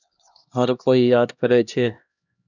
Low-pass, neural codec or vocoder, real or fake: 7.2 kHz; codec, 16 kHz, 1 kbps, X-Codec, HuBERT features, trained on LibriSpeech; fake